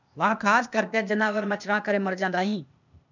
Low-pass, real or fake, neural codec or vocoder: 7.2 kHz; fake; codec, 16 kHz, 0.8 kbps, ZipCodec